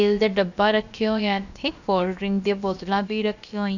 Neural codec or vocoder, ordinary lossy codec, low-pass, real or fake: codec, 16 kHz, about 1 kbps, DyCAST, with the encoder's durations; none; 7.2 kHz; fake